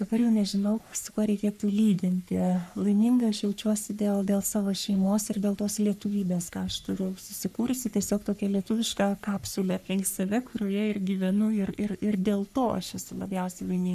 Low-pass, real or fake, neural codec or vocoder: 14.4 kHz; fake; codec, 44.1 kHz, 3.4 kbps, Pupu-Codec